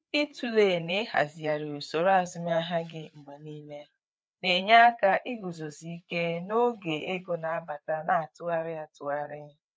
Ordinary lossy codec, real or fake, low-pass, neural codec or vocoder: none; fake; none; codec, 16 kHz, 4 kbps, FreqCodec, larger model